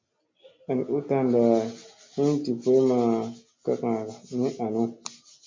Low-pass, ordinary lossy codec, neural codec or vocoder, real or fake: 7.2 kHz; MP3, 48 kbps; none; real